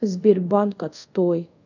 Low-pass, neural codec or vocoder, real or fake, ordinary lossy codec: 7.2 kHz; codec, 24 kHz, 0.5 kbps, DualCodec; fake; none